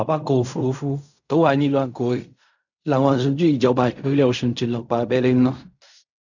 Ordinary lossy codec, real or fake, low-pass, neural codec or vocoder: none; fake; 7.2 kHz; codec, 16 kHz in and 24 kHz out, 0.4 kbps, LongCat-Audio-Codec, fine tuned four codebook decoder